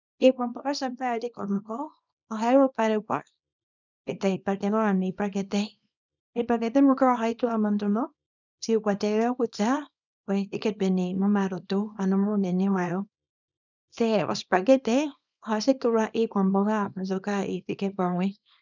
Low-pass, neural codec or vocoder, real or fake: 7.2 kHz; codec, 24 kHz, 0.9 kbps, WavTokenizer, small release; fake